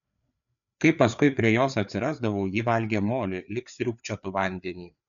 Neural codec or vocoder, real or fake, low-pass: codec, 16 kHz, 4 kbps, FreqCodec, larger model; fake; 7.2 kHz